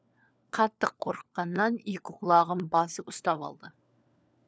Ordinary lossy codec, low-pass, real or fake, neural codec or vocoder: none; none; fake; codec, 16 kHz, 4 kbps, FunCodec, trained on LibriTTS, 50 frames a second